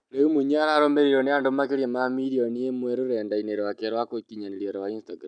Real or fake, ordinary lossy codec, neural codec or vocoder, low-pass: real; none; none; 9.9 kHz